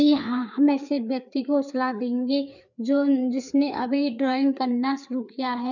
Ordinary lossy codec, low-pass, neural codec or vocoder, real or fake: none; 7.2 kHz; codec, 16 kHz, 4 kbps, FreqCodec, larger model; fake